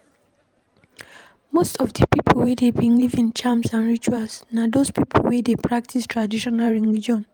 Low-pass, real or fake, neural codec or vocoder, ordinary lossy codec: 19.8 kHz; fake; vocoder, 44.1 kHz, 128 mel bands every 512 samples, BigVGAN v2; Opus, 32 kbps